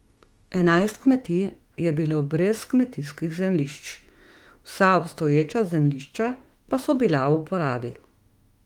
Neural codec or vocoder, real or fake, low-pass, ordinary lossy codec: autoencoder, 48 kHz, 32 numbers a frame, DAC-VAE, trained on Japanese speech; fake; 19.8 kHz; Opus, 24 kbps